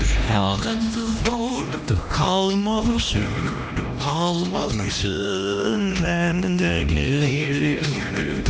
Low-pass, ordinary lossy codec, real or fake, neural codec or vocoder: none; none; fake; codec, 16 kHz, 1 kbps, X-Codec, HuBERT features, trained on LibriSpeech